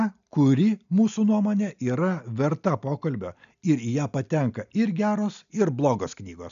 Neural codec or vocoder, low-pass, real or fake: none; 7.2 kHz; real